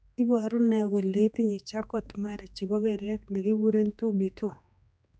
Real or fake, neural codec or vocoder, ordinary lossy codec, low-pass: fake; codec, 16 kHz, 2 kbps, X-Codec, HuBERT features, trained on general audio; none; none